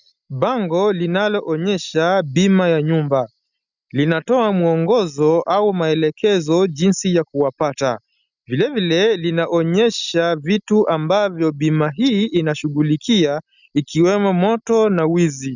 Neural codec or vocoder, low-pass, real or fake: none; 7.2 kHz; real